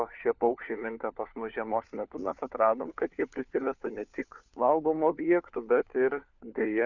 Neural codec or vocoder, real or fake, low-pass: codec, 16 kHz, 4 kbps, FunCodec, trained on LibriTTS, 50 frames a second; fake; 7.2 kHz